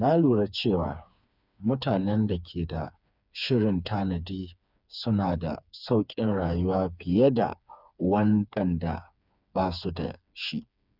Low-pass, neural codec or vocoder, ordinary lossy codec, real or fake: 5.4 kHz; codec, 16 kHz, 4 kbps, FreqCodec, smaller model; none; fake